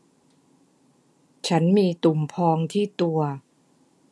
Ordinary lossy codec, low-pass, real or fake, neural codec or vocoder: none; none; real; none